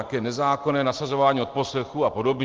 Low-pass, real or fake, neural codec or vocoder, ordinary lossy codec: 7.2 kHz; real; none; Opus, 24 kbps